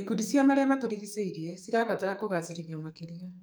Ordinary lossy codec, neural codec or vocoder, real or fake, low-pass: none; codec, 44.1 kHz, 2.6 kbps, SNAC; fake; none